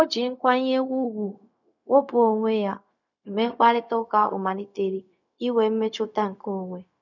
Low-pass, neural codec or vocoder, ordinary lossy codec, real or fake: 7.2 kHz; codec, 16 kHz, 0.4 kbps, LongCat-Audio-Codec; none; fake